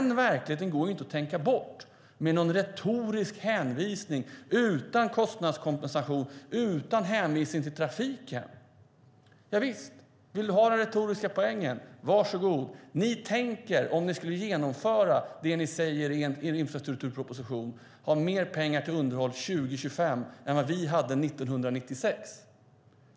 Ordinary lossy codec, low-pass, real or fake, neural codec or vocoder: none; none; real; none